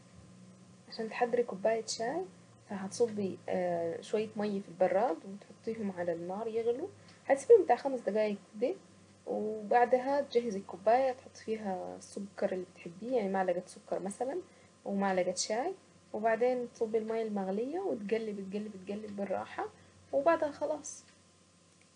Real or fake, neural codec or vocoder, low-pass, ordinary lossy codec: real; none; 9.9 kHz; MP3, 64 kbps